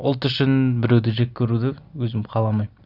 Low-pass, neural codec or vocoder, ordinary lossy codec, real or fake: 5.4 kHz; none; none; real